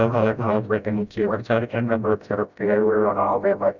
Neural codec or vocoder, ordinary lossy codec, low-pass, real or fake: codec, 16 kHz, 0.5 kbps, FreqCodec, smaller model; none; 7.2 kHz; fake